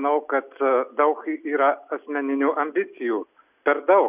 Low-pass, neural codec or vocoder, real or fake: 3.6 kHz; none; real